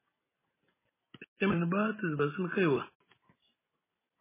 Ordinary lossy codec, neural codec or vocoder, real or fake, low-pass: MP3, 16 kbps; none; real; 3.6 kHz